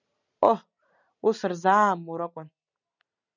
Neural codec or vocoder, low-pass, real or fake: none; 7.2 kHz; real